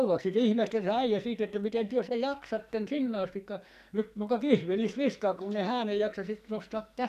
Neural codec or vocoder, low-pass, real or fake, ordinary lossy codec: codec, 44.1 kHz, 2.6 kbps, SNAC; 14.4 kHz; fake; none